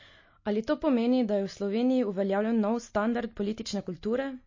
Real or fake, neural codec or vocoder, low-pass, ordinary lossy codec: real; none; 7.2 kHz; MP3, 32 kbps